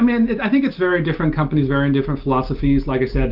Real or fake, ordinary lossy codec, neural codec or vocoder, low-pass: real; Opus, 32 kbps; none; 5.4 kHz